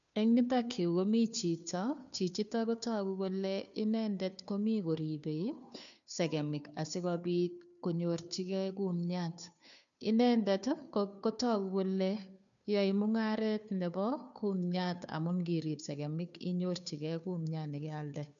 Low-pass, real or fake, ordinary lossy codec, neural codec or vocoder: 7.2 kHz; fake; none; codec, 16 kHz, 2 kbps, FunCodec, trained on Chinese and English, 25 frames a second